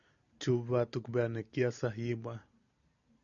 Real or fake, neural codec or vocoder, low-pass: real; none; 7.2 kHz